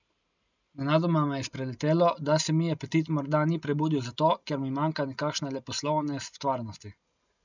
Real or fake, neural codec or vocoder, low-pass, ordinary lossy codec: real; none; 7.2 kHz; none